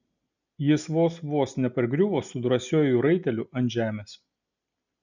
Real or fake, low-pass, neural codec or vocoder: real; 7.2 kHz; none